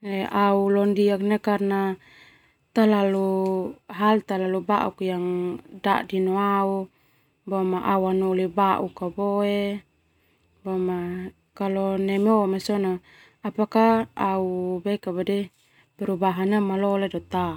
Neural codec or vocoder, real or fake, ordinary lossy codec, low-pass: none; real; none; 19.8 kHz